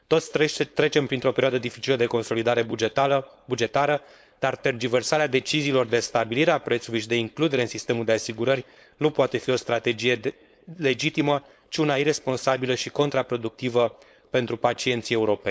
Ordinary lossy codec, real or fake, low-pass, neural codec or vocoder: none; fake; none; codec, 16 kHz, 4.8 kbps, FACodec